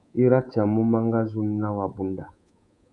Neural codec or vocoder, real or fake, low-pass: codec, 24 kHz, 3.1 kbps, DualCodec; fake; 10.8 kHz